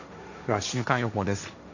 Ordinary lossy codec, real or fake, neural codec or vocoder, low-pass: none; fake; codec, 16 kHz, 1.1 kbps, Voila-Tokenizer; 7.2 kHz